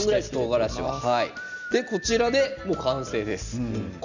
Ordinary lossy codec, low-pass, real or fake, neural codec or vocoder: none; 7.2 kHz; real; none